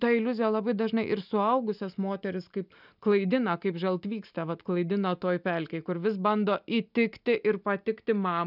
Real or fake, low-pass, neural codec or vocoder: real; 5.4 kHz; none